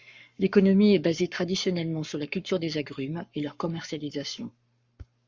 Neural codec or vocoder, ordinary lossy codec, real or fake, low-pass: codec, 44.1 kHz, 7.8 kbps, Pupu-Codec; Opus, 64 kbps; fake; 7.2 kHz